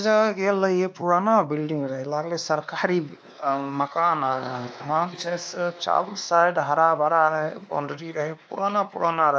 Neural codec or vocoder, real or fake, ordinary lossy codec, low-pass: codec, 16 kHz, 2 kbps, X-Codec, WavLM features, trained on Multilingual LibriSpeech; fake; none; none